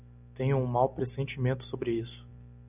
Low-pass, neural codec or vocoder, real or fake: 3.6 kHz; none; real